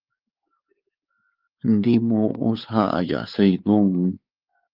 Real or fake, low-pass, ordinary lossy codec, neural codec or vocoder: fake; 5.4 kHz; Opus, 32 kbps; codec, 16 kHz, 4 kbps, X-Codec, WavLM features, trained on Multilingual LibriSpeech